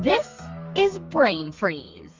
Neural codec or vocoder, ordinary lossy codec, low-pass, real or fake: codec, 32 kHz, 1.9 kbps, SNAC; Opus, 32 kbps; 7.2 kHz; fake